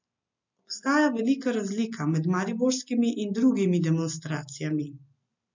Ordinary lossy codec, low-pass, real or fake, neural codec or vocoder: MP3, 64 kbps; 7.2 kHz; real; none